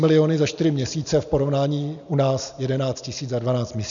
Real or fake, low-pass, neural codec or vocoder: real; 7.2 kHz; none